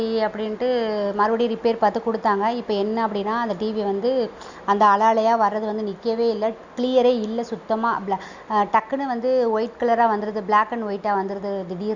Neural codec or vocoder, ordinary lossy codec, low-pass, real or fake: none; Opus, 64 kbps; 7.2 kHz; real